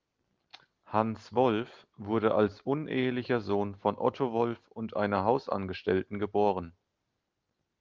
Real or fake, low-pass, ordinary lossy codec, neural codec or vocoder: real; 7.2 kHz; Opus, 32 kbps; none